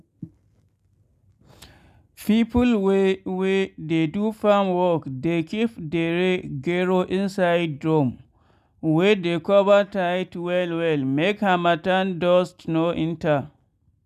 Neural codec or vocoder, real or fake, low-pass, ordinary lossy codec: none; real; 14.4 kHz; none